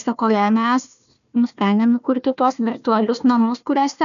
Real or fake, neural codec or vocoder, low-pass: fake; codec, 16 kHz, 1 kbps, FunCodec, trained on Chinese and English, 50 frames a second; 7.2 kHz